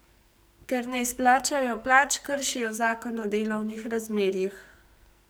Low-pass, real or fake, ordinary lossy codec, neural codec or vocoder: none; fake; none; codec, 44.1 kHz, 2.6 kbps, SNAC